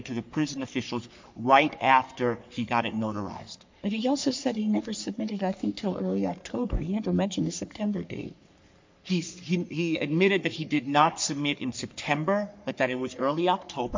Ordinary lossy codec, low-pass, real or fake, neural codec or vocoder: MP3, 48 kbps; 7.2 kHz; fake; codec, 44.1 kHz, 3.4 kbps, Pupu-Codec